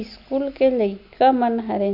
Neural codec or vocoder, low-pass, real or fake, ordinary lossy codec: none; 5.4 kHz; real; none